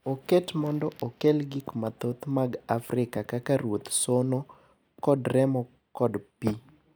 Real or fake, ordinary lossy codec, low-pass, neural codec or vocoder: real; none; none; none